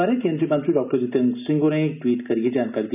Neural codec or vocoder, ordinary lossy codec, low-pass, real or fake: none; none; 3.6 kHz; real